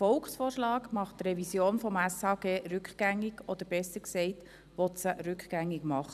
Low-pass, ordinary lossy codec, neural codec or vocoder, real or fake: 14.4 kHz; none; vocoder, 44.1 kHz, 128 mel bands every 256 samples, BigVGAN v2; fake